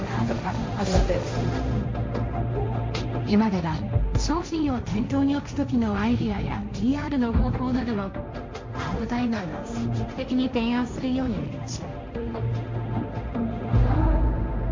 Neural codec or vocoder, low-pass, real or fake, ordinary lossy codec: codec, 16 kHz, 1.1 kbps, Voila-Tokenizer; 7.2 kHz; fake; MP3, 48 kbps